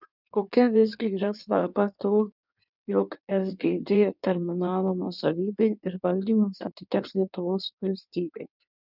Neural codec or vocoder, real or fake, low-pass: codec, 16 kHz in and 24 kHz out, 1.1 kbps, FireRedTTS-2 codec; fake; 5.4 kHz